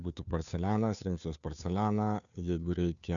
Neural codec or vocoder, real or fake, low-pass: codec, 16 kHz, 2 kbps, FunCodec, trained on Chinese and English, 25 frames a second; fake; 7.2 kHz